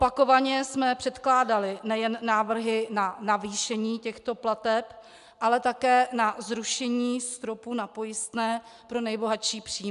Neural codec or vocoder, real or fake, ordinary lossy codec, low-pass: none; real; AAC, 96 kbps; 10.8 kHz